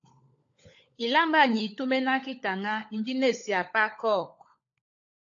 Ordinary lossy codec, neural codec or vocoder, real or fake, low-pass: AAC, 48 kbps; codec, 16 kHz, 16 kbps, FunCodec, trained on LibriTTS, 50 frames a second; fake; 7.2 kHz